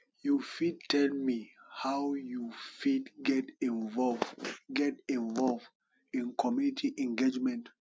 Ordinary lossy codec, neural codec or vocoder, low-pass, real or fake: none; none; none; real